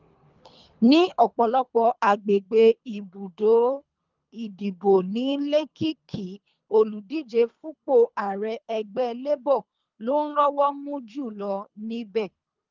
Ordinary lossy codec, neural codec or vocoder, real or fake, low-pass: Opus, 24 kbps; codec, 24 kHz, 3 kbps, HILCodec; fake; 7.2 kHz